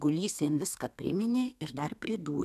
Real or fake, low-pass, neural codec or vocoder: fake; 14.4 kHz; codec, 32 kHz, 1.9 kbps, SNAC